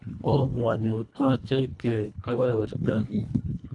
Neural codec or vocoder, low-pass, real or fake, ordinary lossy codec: codec, 24 kHz, 1.5 kbps, HILCodec; 10.8 kHz; fake; none